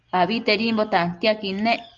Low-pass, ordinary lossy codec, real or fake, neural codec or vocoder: 7.2 kHz; Opus, 32 kbps; real; none